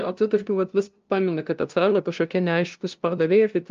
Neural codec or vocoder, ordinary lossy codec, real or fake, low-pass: codec, 16 kHz, 0.5 kbps, FunCodec, trained on LibriTTS, 25 frames a second; Opus, 24 kbps; fake; 7.2 kHz